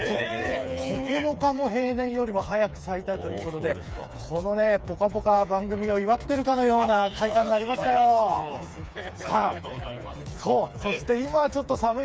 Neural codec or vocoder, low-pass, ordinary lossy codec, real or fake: codec, 16 kHz, 4 kbps, FreqCodec, smaller model; none; none; fake